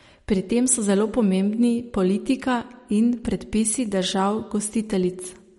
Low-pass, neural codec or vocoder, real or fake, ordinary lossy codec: 19.8 kHz; none; real; MP3, 48 kbps